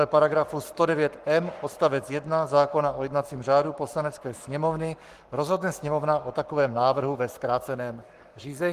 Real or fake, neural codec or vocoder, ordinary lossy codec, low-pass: fake; codec, 44.1 kHz, 7.8 kbps, Pupu-Codec; Opus, 24 kbps; 14.4 kHz